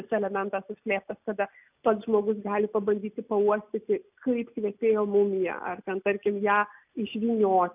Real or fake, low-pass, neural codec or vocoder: real; 3.6 kHz; none